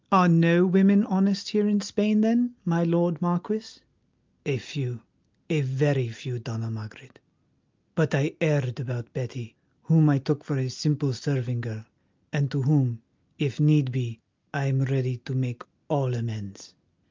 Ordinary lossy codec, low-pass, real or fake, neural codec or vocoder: Opus, 24 kbps; 7.2 kHz; real; none